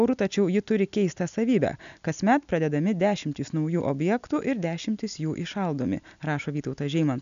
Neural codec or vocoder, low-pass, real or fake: none; 7.2 kHz; real